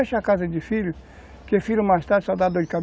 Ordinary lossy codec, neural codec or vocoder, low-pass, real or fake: none; none; none; real